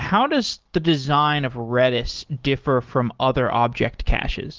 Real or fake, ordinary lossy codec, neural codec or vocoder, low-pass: real; Opus, 16 kbps; none; 7.2 kHz